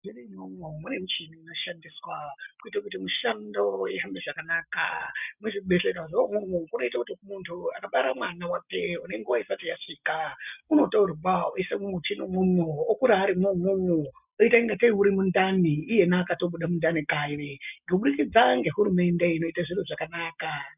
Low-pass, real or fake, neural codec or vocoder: 3.6 kHz; real; none